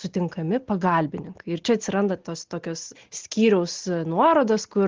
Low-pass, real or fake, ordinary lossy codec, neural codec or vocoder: 7.2 kHz; real; Opus, 16 kbps; none